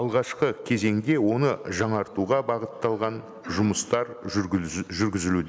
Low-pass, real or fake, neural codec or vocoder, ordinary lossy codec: none; real; none; none